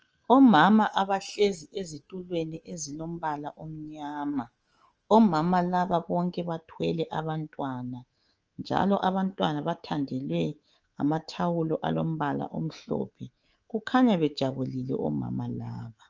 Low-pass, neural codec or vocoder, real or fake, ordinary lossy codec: 7.2 kHz; codec, 24 kHz, 3.1 kbps, DualCodec; fake; Opus, 24 kbps